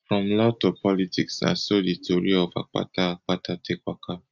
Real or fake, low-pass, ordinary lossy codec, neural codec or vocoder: real; 7.2 kHz; Opus, 64 kbps; none